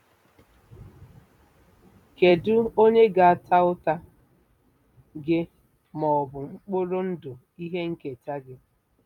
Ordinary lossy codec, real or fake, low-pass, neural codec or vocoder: none; fake; 19.8 kHz; vocoder, 44.1 kHz, 128 mel bands every 256 samples, BigVGAN v2